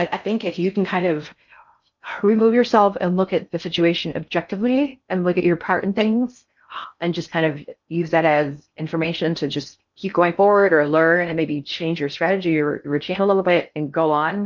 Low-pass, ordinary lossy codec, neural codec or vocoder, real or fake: 7.2 kHz; MP3, 64 kbps; codec, 16 kHz in and 24 kHz out, 0.6 kbps, FocalCodec, streaming, 2048 codes; fake